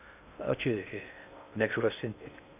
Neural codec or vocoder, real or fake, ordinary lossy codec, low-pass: codec, 16 kHz in and 24 kHz out, 0.6 kbps, FocalCodec, streaming, 2048 codes; fake; none; 3.6 kHz